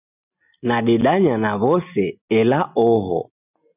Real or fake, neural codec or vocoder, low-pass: real; none; 3.6 kHz